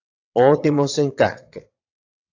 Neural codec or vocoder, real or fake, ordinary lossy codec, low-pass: vocoder, 22.05 kHz, 80 mel bands, WaveNeXt; fake; AAC, 48 kbps; 7.2 kHz